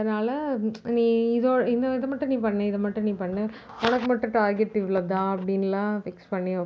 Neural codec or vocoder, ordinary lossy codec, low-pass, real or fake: none; none; none; real